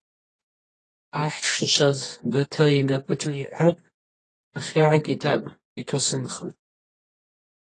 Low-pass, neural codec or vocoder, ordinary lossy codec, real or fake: 10.8 kHz; codec, 24 kHz, 0.9 kbps, WavTokenizer, medium music audio release; AAC, 32 kbps; fake